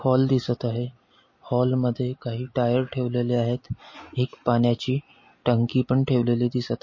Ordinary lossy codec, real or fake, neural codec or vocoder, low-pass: MP3, 32 kbps; real; none; 7.2 kHz